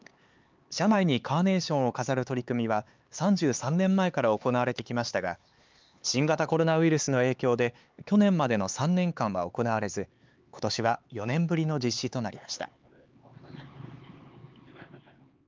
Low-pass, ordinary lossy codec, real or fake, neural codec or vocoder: 7.2 kHz; Opus, 24 kbps; fake; codec, 16 kHz, 4 kbps, X-Codec, HuBERT features, trained on LibriSpeech